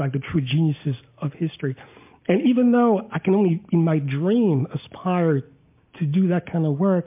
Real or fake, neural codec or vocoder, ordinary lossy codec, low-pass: real; none; MP3, 32 kbps; 3.6 kHz